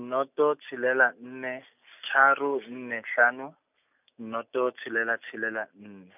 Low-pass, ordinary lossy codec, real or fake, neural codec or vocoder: 3.6 kHz; none; real; none